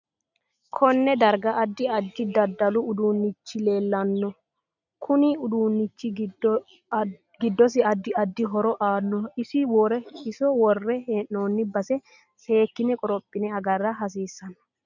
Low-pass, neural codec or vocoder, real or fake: 7.2 kHz; none; real